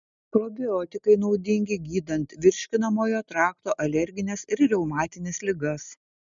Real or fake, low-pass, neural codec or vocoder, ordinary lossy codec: real; 7.2 kHz; none; MP3, 96 kbps